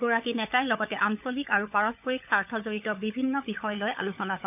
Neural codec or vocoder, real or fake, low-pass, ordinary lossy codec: codec, 16 kHz, 16 kbps, FunCodec, trained on LibriTTS, 50 frames a second; fake; 3.6 kHz; none